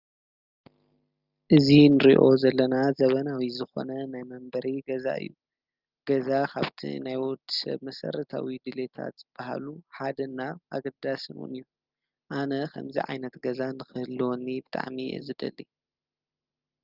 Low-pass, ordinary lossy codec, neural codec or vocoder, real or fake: 5.4 kHz; Opus, 32 kbps; none; real